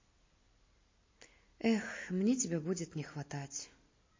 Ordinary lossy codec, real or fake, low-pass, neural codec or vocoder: MP3, 32 kbps; real; 7.2 kHz; none